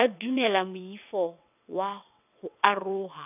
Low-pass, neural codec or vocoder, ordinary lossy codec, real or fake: 3.6 kHz; vocoder, 22.05 kHz, 80 mel bands, WaveNeXt; none; fake